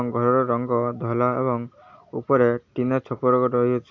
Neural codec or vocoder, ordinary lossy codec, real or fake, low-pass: none; AAC, 48 kbps; real; 7.2 kHz